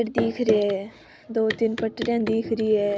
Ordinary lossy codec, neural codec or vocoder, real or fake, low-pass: none; none; real; none